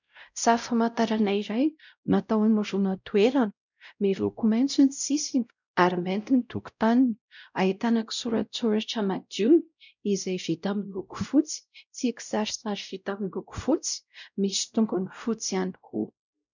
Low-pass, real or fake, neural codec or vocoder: 7.2 kHz; fake; codec, 16 kHz, 0.5 kbps, X-Codec, WavLM features, trained on Multilingual LibriSpeech